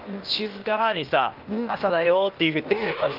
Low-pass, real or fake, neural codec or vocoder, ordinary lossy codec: 5.4 kHz; fake; codec, 16 kHz, 0.8 kbps, ZipCodec; Opus, 32 kbps